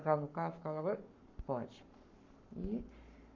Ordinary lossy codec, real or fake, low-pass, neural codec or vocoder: MP3, 64 kbps; fake; 7.2 kHz; codec, 44.1 kHz, 7.8 kbps, Pupu-Codec